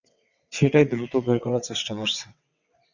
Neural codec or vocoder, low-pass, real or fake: vocoder, 22.05 kHz, 80 mel bands, WaveNeXt; 7.2 kHz; fake